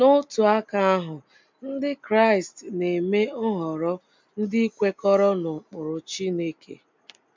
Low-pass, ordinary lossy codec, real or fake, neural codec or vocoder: 7.2 kHz; MP3, 64 kbps; real; none